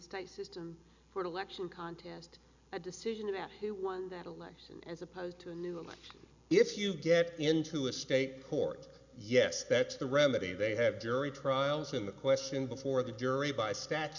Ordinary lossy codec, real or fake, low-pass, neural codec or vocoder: Opus, 64 kbps; real; 7.2 kHz; none